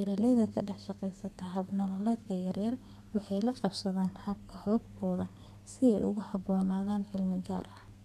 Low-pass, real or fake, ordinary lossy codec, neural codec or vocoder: 14.4 kHz; fake; none; codec, 32 kHz, 1.9 kbps, SNAC